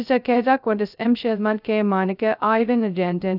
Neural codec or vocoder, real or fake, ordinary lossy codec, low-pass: codec, 16 kHz, 0.2 kbps, FocalCodec; fake; none; 5.4 kHz